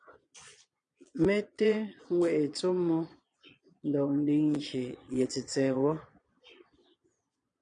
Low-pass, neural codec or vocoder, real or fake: 9.9 kHz; vocoder, 22.05 kHz, 80 mel bands, Vocos; fake